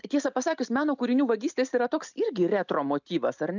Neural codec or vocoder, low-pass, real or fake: none; 7.2 kHz; real